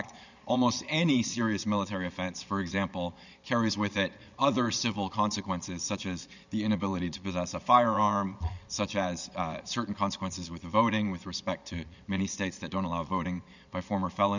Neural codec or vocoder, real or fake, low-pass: vocoder, 22.05 kHz, 80 mel bands, Vocos; fake; 7.2 kHz